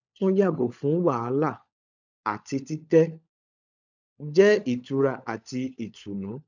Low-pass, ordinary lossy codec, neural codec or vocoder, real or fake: 7.2 kHz; none; codec, 16 kHz, 16 kbps, FunCodec, trained on LibriTTS, 50 frames a second; fake